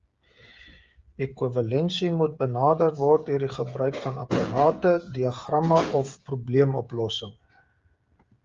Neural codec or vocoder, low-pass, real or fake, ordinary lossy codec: codec, 16 kHz, 8 kbps, FreqCodec, smaller model; 7.2 kHz; fake; Opus, 32 kbps